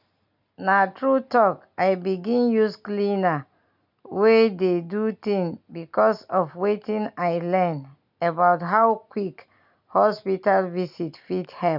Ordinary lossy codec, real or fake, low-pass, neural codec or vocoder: none; real; 5.4 kHz; none